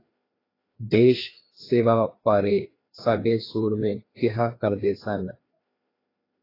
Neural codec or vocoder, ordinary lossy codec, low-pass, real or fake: codec, 16 kHz, 2 kbps, FreqCodec, larger model; AAC, 24 kbps; 5.4 kHz; fake